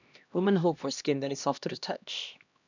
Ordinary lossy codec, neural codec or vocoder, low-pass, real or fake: none; codec, 16 kHz, 1 kbps, X-Codec, HuBERT features, trained on LibriSpeech; 7.2 kHz; fake